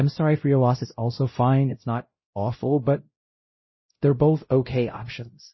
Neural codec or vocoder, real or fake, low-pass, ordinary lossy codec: codec, 16 kHz, 0.5 kbps, X-Codec, WavLM features, trained on Multilingual LibriSpeech; fake; 7.2 kHz; MP3, 24 kbps